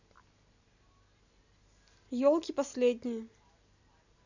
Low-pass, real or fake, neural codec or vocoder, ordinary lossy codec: 7.2 kHz; real; none; none